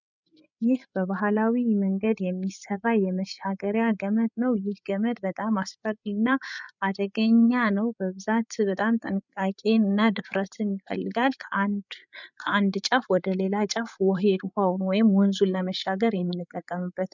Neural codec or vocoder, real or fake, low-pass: codec, 16 kHz, 8 kbps, FreqCodec, larger model; fake; 7.2 kHz